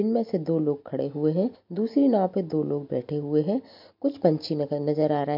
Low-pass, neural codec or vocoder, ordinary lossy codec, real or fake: 5.4 kHz; none; MP3, 48 kbps; real